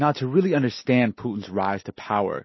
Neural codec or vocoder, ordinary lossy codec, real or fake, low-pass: none; MP3, 24 kbps; real; 7.2 kHz